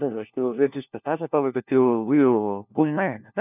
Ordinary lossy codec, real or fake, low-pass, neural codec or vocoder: none; fake; 3.6 kHz; codec, 16 kHz, 1 kbps, FunCodec, trained on LibriTTS, 50 frames a second